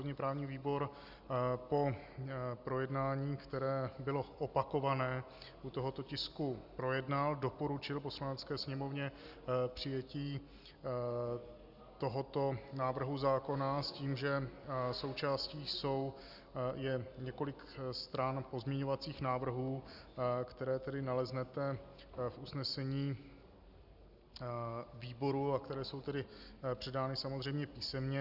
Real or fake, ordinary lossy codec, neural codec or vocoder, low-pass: real; Opus, 64 kbps; none; 5.4 kHz